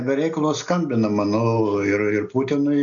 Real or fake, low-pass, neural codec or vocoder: real; 7.2 kHz; none